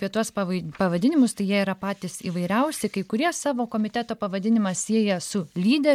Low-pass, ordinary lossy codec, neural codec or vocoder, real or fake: 19.8 kHz; MP3, 96 kbps; none; real